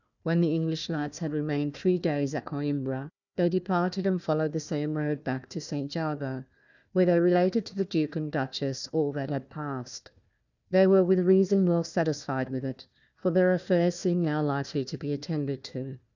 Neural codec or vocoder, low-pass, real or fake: codec, 16 kHz, 1 kbps, FunCodec, trained on Chinese and English, 50 frames a second; 7.2 kHz; fake